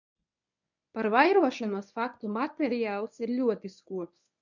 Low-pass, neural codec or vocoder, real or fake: 7.2 kHz; codec, 24 kHz, 0.9 kbps, WavTokenizer, medium speech release version 1; fake